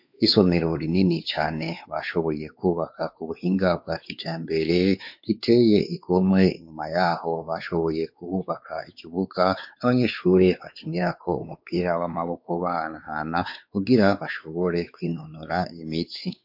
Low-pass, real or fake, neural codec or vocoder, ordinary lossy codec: 5.4 kHz; fake; codec, 16 kHz, 4 kbps, X-Codec, WavLM features, trained on Multilingual LibriSpeech; MP3, 48 kbps